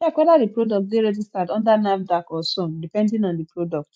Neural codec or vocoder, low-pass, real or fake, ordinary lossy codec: none; none; real; none